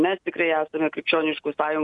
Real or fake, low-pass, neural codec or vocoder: real; 10.8 kHz; none